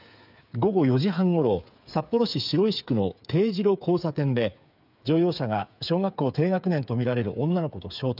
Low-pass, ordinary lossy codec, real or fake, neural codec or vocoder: 5.4 kHz; none; fake; codec, 16 kHz, 8 kbps, FreqCodec, smaller model